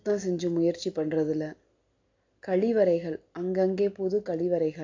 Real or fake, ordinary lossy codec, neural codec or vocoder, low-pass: real; AAC, 48 kbps; none; 7.2 kHz